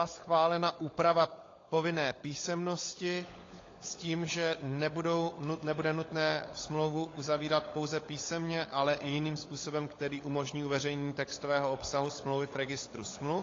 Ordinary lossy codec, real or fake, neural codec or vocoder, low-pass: AAC, 32 kbps; fake; codec, 16 kHz, 16 kbps, FunCodec, trained on LibriTTS, 50 frames a second; 7.2 kHz